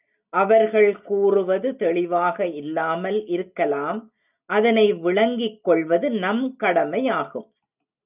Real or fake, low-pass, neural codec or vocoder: real; 3.6 kHz; none